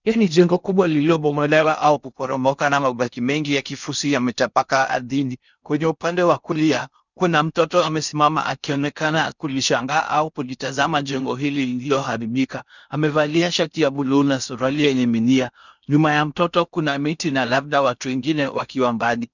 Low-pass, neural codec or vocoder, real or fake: 7.2 kHz; codec, 16 kHz in and 24 kHz out, 0.6 kbps, FocalCodec, streaming, 4096 codes; fake